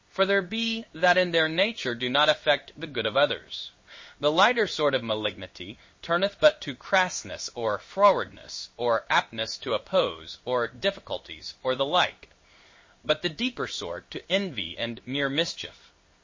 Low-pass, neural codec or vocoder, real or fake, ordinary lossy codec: 7.2 kHz; codec, 16 kHz in and 24 kHz out, 1 kbps, XY-Tokenizer; fake; MP3, 32 kbps